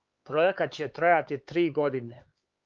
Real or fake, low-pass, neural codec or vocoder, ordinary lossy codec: fake; 7.2 kHz; codec, 16 kHz, 4 kbps, X-Codec, HuBERT features, trained on LibriSpeech; Opus, 32 kbps